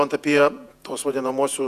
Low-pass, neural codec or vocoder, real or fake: 14.4 kHz; vocoder, 48 kHz, 128 mel bands, Vocos; fake